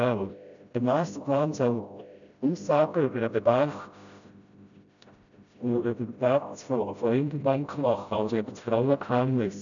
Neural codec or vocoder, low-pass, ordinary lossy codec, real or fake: codec, 16 kHz, 0.5 kbps, FreqCodec, smaller model; 7.2 kHz; none; fake